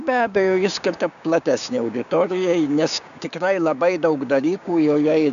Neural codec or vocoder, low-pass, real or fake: codec, 16 kHz, 6 kbps, DAC; 7.2 kHz; fake